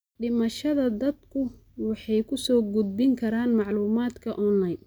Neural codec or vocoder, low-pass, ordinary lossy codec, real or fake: none; none; none; real